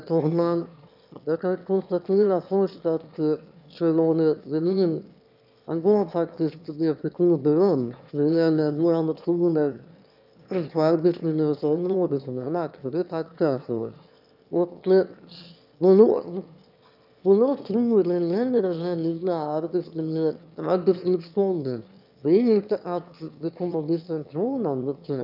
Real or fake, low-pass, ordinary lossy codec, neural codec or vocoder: fake; 5.4 kHz; none; autoencoder, 22.05 kHz, a latent of 192 numbers a frame, VITS, trained on one speaker